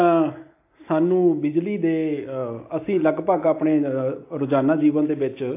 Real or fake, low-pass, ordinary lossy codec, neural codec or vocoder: real; 3.6 kHz; AAC, 24 kbps; none